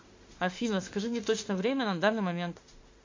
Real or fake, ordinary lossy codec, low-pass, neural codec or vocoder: fake; MP3, 48 kbps; 7.2 kHz; autoencoder, 48 kHz, 32 numbers a frame, DAC-VAE, trained on Japanese speech